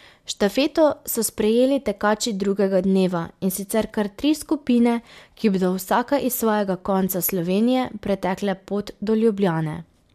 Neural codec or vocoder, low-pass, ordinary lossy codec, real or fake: none; 14.4 kHz; MP3, 96 kbps; real